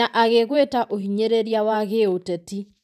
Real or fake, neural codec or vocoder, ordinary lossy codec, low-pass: fake; vocoder, 44.1 kHz, 128 mel bands every 512 samples, BigVGAN v2; none; 14.4 kHz